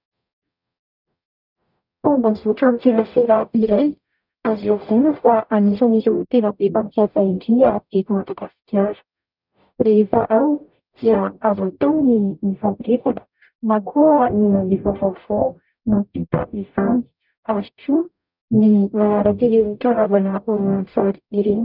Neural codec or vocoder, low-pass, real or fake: codec, 44.1 kHz, 0.9 kbps, DAC; 5.4 kHz; fake